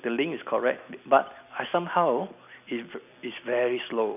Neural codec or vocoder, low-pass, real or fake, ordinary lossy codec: vocoder, 44.1 kHz, 128 mel bands every 256 samples, BigVGAN v2; 3.6 kHz; fake; none